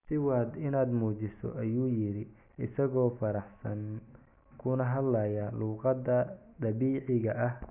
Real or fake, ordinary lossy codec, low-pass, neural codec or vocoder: real; none; 3.6 kHz; none